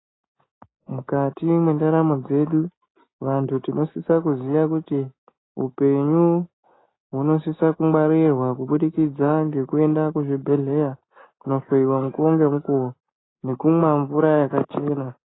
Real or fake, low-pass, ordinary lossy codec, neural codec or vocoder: real; 7.2 kHz; AAC, 16 kbps; none